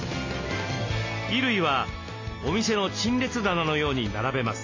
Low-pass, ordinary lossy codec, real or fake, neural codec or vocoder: 7.2 kHz; AAC, 32 kbps; real; none